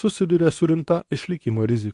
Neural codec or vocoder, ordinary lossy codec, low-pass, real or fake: codec, 24 kHz, 0.9 kbps, WavTokenizer, medium speech release version 2; AAC, 64 kbps; 10.8 kHz; fake